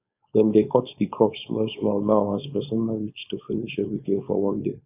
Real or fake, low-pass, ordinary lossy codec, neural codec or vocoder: fake; 3.6 kHz; none; codec, 16 kHz, 4.8 kbps, FACodec